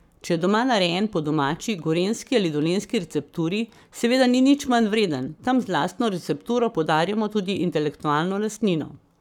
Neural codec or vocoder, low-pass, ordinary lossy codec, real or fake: codec, 44.1 kHz, 7.8 kbps, Pupu-Codec; 19.8 kHz; none; fake